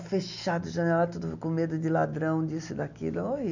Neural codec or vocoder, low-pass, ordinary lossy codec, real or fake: none; 7.2 kHz; none; real